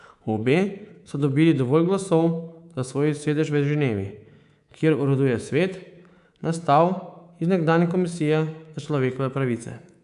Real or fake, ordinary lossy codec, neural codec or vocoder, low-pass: fake; none; codec, 24 kHz, 3.1 kbps, DualCodec; 10.8 kHz